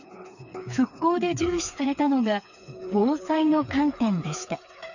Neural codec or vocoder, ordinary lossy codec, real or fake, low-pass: codec, 16 kHz, 4 kbps, FreqCodec, smaller model; none; fake; 7.2 kHz